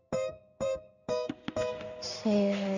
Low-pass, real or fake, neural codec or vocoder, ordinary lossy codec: 7.2 kHz; fake; codec, 16 kHz in and 24 kHz out, 1 kbps, XY-Tokenizer; none